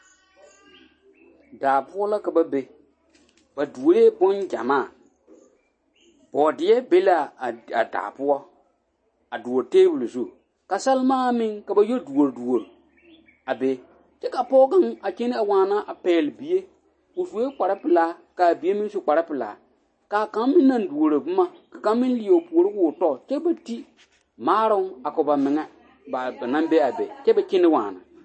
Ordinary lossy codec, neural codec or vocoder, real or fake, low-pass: MP3, 32 kbps; none; real; 9.9 kHz